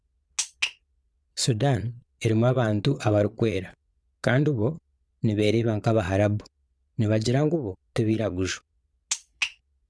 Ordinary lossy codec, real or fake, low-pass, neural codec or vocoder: none; fake; none; vocoder, 22.05 kHz, 80 mel bands, Vocos